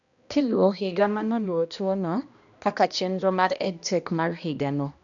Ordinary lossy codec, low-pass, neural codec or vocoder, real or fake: MP3, 96 kbps; 7.2 kHz; codec, 16 kHz, 1 kbps, X-Codec, HuBERT features, trained on balanced general audio; fake